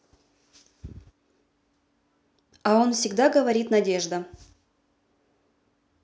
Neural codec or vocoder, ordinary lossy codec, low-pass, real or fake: none; none; none; real